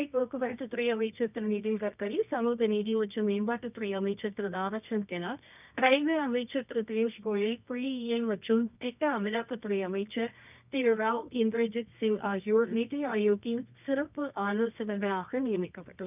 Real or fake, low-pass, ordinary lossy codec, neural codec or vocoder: fake; 3.6 kHz; none; codec, 24 kHz, 0.9 kbps, WavTokenizer, medium music audio release